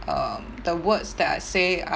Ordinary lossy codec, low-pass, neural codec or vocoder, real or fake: none; none; none; real